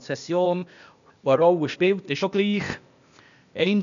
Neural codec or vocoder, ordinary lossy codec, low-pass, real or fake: codec, 16 kHz, 0.8 kbps, ZipCodec; none; 7.2 kHz; fake